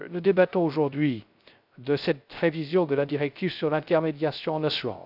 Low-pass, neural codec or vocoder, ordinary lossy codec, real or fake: 5.4 kHz; codec, 16 kHz, 0.3 kbps, FocalCodec; none; fake